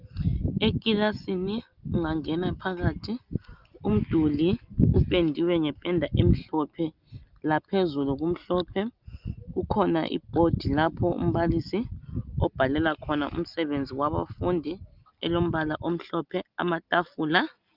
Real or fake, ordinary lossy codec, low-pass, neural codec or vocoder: real; Opus, 24 kbps; 5.4 kHz; none